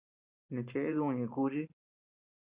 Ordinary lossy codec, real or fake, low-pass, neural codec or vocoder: Opus, 64 kbps; fake; 3.6 kHz; vocoder, 44.1 kHz, 128 mel bands every 512 samples, BigVGAN v2